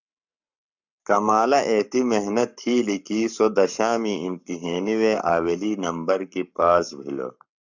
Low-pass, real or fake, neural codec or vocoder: 7.2 kHz; fake; codec, 44.1 kHz, 7.8 kbps, Pupu-Codec